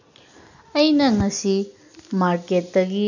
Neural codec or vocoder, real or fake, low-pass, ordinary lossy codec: none; real; 7.2 kHz; none